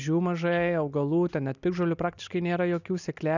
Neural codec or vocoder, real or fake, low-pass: none; real; 7.2 kHz